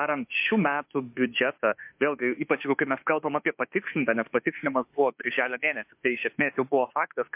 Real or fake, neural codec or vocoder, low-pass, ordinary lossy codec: fake; autoencoder, 48 kHz, 32 numbers a frame, DAC-VAE, trained on Japanese speech; 3.6 kHz; MP3, 32 kbps